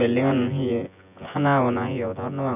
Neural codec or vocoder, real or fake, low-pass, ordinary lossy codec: vocoder, 24 kHz, 100 mel bands, Vocos; fake; 3.6 kHz; none